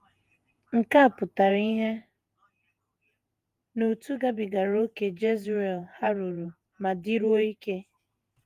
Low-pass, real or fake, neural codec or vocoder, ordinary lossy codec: 14.4 kHz; fake; vocoder, 48 kHz, 128 mel bands, Vocos; Opus, 32 kbps